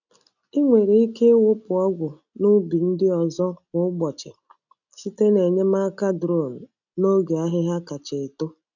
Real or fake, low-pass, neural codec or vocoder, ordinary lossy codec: real; 7.2 kHz; none; none